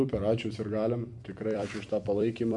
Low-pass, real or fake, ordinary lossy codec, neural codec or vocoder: 10.8 kHz; fake; MP3, 64 kbps; vocoder, 44.1 kHz, 128 mel bands every 256 samples, BigVGAN v2